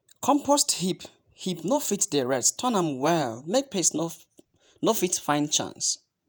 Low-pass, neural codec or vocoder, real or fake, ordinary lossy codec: none; vocoder, 48 kHz, 128 mel bands, Vocos; fake; none